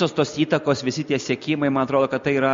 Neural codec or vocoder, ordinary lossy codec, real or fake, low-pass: none; MP3, 48 kbps; real; 7.2 kHz